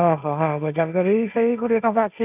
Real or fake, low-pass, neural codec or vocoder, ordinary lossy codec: fake; 3.6 kHz; codec, 16 kHz, 1.1 kbps, Voila-Tokenizer; none